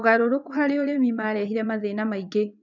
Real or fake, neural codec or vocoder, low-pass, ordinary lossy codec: fake; vocoder, 22.05 kHz, 80 mel bands, WaveNeXt; 7.2 kHz; none